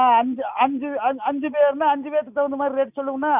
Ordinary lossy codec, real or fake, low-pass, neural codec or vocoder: none; real; 3.6 kHz; none